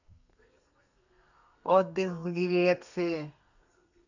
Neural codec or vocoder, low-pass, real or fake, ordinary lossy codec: codec, 32 kHz, 1.9 kbps, SNAC; 7.2 kHz; fake; none